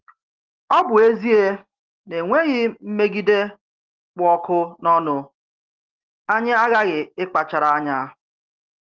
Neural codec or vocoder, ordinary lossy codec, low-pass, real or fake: none; Opus, 16 kbps; 7.2 kHz; real